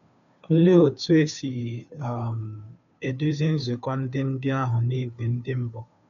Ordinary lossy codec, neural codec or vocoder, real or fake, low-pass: none; codec, 16 kHz, 2 kbps, FunCodec, trained on Chinese and English, 25 frames a second; fake; 7.2 kHz